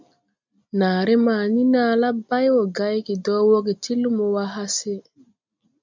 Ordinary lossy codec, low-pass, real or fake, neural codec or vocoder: MP3, 64 kbps; 7.2 kHz; real; none